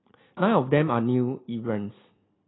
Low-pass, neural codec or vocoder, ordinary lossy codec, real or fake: 7.2 kHz; none; AAC, 16 kbps; real